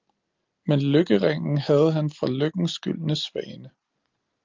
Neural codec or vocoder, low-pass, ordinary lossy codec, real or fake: none; 7.2 kHz; Opus, 32 kbps; real